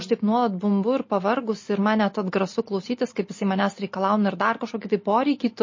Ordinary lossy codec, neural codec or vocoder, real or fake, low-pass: MP3, 32 kbps; none; real; 7.2 kHz